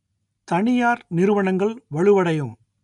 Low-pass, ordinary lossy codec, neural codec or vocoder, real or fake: 10.8 kHz; none; none; real